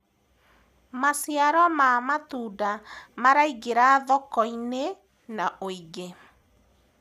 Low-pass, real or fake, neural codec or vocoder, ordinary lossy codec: 14.4 kHz; real; none; none